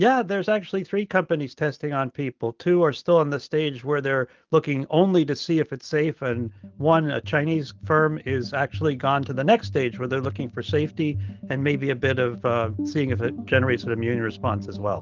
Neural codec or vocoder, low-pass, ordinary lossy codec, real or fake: none; 7.2 kHz; Opus, 32 kbps; real